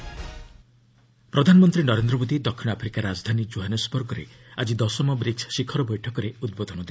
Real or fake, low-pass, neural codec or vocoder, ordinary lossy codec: real; none; none; none